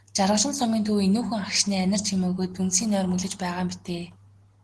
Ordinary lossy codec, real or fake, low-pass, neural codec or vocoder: Opus, 16 kbps; real; 10.8 kHz; none